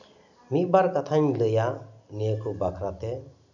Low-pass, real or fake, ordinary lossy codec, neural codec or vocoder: 7.2 kHz; real; none; none